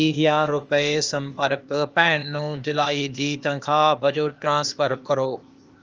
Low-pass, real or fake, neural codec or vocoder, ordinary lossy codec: 7.2 kHz; fake; codec, 16 kHz, 0.8 kbps, ZipCodec; Opus, 24 kbps